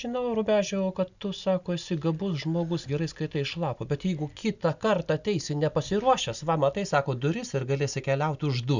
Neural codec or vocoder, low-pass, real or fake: none; 7.2 kHz; real